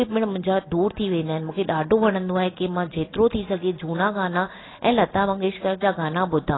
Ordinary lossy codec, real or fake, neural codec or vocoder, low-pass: AAC, 16 kbps; real; none; 7.2 kHz